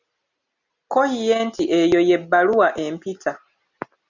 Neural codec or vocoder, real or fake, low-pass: none; real; 7.2 kHz